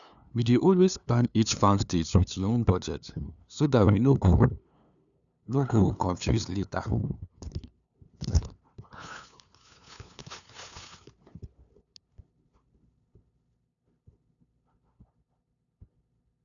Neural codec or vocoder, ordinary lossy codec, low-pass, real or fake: codec, 16 kHz, 2 kbps, FunCodec, trained on LibriTTS, 25 frames a second; none; 7.2 kHz; fake